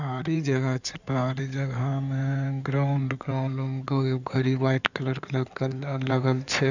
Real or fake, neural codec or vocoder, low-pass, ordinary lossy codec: fake; codec, 16 kHz in and 24 kHz out, 2.2 kbps, FireRedTTS-2 codec; 7.2 kHz; none